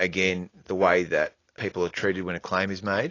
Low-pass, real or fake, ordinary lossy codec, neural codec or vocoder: 7.2 kHz; real; AAC, 32 kbps; none